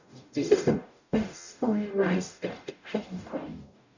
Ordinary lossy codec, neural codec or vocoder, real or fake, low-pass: none; codec, 44.1 kHz, 0.9 kbps, DAC; fake; 7.2 kHz